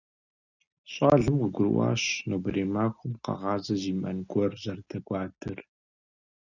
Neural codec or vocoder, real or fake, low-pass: none; real; 7.2 kHz